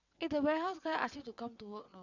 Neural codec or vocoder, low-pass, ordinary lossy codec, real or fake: vocoder, 22.05 kHz, 80 mel bands, WaveNeXt; 7.2 kHz; none; fake